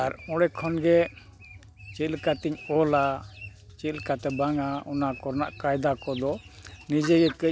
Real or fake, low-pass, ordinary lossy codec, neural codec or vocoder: real; none; none; none